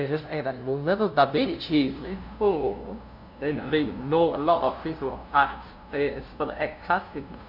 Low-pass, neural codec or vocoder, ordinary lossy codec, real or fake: 5.4 kHz; codec, 16 kHz, 0.5 kbps, FunCodec, trained on LibriTTS, 25 frames a second; none; fake